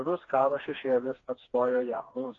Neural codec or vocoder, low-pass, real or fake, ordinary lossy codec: codec, 16 kHz, 2 kbps, FreqCodec, smaller model; 7.2 kHz; fake; AAC, 32 kbps